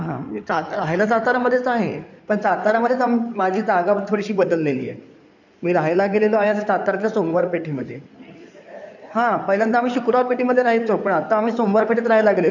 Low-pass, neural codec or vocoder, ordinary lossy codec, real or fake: 7.2 kHz; codec, 16 kHz in and 24 kHz out, 2.2 kbps, FireRedTTS-2 codec; none; fake